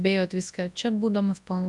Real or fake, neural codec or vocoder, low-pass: fake; codec, 24 kHz, 0.9 kbps, WavTokenizer, large speech release; 10.8 kHz